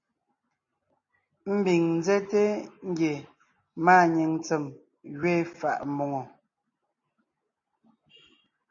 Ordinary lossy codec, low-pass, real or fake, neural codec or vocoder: MP3, 32 kbps; 7.2 kHz; real; none